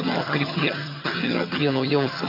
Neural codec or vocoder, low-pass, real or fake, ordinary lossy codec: vocoder, 22.05 kHz, 80 mel bands, HiFi-GAN; 5.4 kHz; fake; MP3, 32 kbps